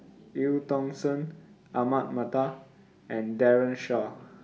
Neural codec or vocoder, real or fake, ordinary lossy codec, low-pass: none; real; none; none